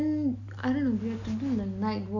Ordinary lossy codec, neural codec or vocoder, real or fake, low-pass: none; none; real; 7.2 kHz